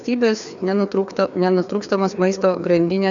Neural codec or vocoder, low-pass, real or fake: codec, 16 kHz, 2 kbps, FreqCodec, larger model; 7.2 kHz; fake